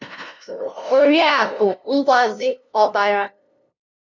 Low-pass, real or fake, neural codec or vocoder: 7.2 kHz; fake; codec, 16 kHz, 0.5 kbps, FunCodec, trained on LibriTTS, 25 frames a second